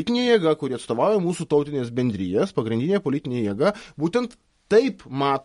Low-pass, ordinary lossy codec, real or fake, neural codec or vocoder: 19.8 kHz; MP3, 48 kbps; real; none